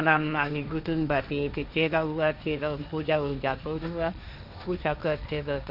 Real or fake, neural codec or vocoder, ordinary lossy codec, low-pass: fake; codec, 16 kHz, 1.1 kbps, Voila-Tokenizer; none; 5.4 kHz